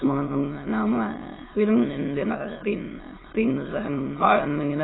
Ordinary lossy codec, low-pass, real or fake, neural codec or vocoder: AAC, 16 kbps; 7.2 kHz; fake; autoencoder, 22.05 kHz, a latent of 192 numbers a frame, VITS, trained on many speakers